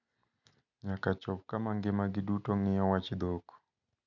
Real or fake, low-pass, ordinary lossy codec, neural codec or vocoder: real; 7.2 kHz; none; none